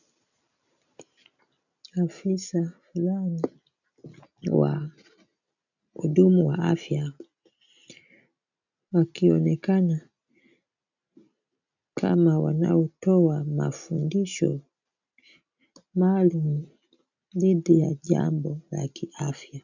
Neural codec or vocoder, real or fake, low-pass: none; real; 7.2 kHz